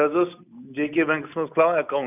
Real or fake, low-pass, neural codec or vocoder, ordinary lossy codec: real; 3.6 kHz; none; none